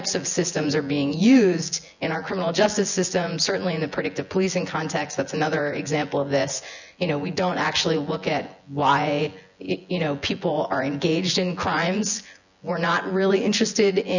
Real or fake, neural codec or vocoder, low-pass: fake; vocoder, 24 kHz, 100 mel bands, Vocos; 7.2 kHz